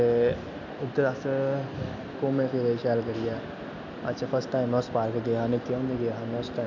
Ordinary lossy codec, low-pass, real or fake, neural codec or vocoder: none; 7.2 kHz; real; none